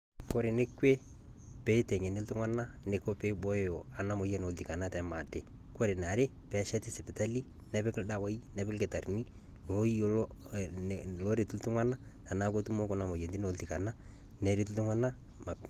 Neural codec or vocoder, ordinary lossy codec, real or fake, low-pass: none; Opus, 24 kbps; real; 14.4 kHz